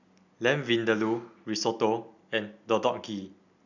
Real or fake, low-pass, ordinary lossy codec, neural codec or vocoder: real; 7.2 kHz; none; none